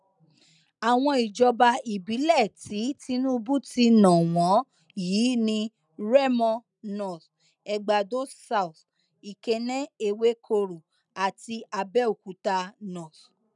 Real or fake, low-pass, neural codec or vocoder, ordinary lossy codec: real; 10.8 kHz; none; none